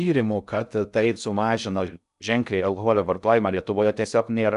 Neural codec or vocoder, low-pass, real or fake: codec, 16 kHz in and 24 kHz out, 0.6 kbps, FocalCodec, streaming, 2048 codes; 10.8 kHz; fake